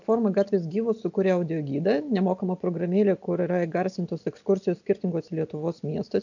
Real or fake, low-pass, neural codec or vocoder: real; 7.2 kHz; none